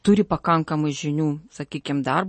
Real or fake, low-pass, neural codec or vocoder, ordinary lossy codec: real; 10.8 kHz; none; MP3, 32 kbps